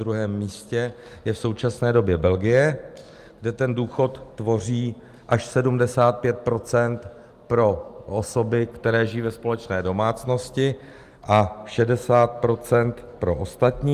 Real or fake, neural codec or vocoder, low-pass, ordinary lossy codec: real; none; 14.4 kHz; Opus, 32 kbps